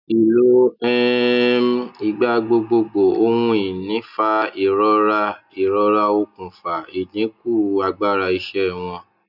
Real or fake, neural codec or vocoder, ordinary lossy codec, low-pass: real; none; none; 5.4 kHz